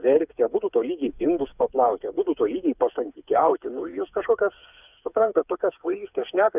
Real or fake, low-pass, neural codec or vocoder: fake; 3.6 kHz; codec, 16 kHz, 4 kbps, FreqCodec, smaller model